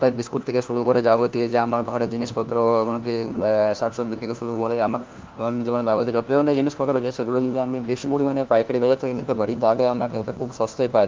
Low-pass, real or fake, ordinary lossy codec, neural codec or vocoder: 7.2 kHz; fake; Opus, 24 kbps; codec, 16 kHz, 1 kbps, FunCodec, trained on LibriTTS, 50 frames a second